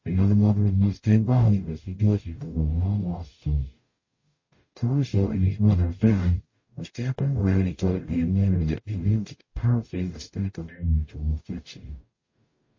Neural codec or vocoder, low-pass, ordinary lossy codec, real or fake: codec, 44.1 kHz, 0.9 kbps, DAC; 7.2 kHz; MP3, 32 kbps; fake